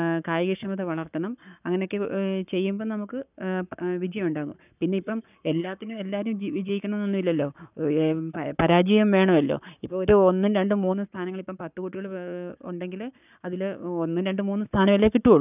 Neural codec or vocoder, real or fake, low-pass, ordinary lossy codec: codec, 44.1 kHz, 7.8 kbps, Pupu-Codec; fake; 3.6 kHz; none